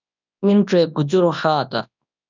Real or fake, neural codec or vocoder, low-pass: fake; codec, 24 kHz, 0.9 kbps, WavTokenizer, large speech release; 7.2 kHz